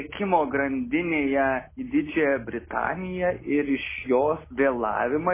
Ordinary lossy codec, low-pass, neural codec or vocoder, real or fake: MP3, 16 kbps; 3.6 kHz; none; real